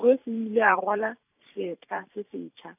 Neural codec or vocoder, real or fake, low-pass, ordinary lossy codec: vocoder, 44.1 kHz, 128 mel bands every 512 samples, BigVGAN v2; fake; 3.6 kHz; none